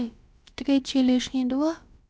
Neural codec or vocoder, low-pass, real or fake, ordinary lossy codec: codec, 16 kHz, about 1 kbps, DyCAST, with the encoder's durations; none; fake; none